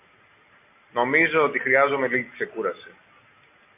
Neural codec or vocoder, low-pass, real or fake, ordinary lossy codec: none; 3.6 kHz; real; MP3, 32 kbps